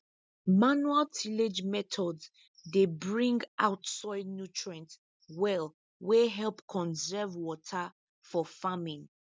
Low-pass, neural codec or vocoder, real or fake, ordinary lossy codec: none; none; real; none